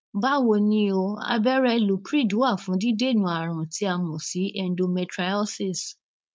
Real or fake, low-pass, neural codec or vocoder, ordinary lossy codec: fake; none; codec, 16 kHz, 4.8 kbps, FACodec; none